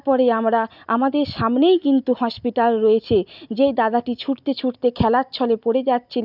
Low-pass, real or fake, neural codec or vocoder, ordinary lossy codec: 5.4 kHz; real; none; none